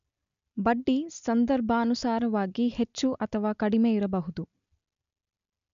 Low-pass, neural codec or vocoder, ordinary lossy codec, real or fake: 7.2 kHz; none; none; real